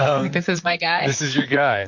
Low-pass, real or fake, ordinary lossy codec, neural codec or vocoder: 7.2 kHz; fake; MP3, 48 kbps; vocoder, 44.1 kHz, 128 mel bands, Pupu-Vocoder